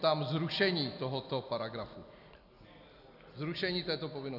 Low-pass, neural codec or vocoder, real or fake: 5.4 kHz; none; real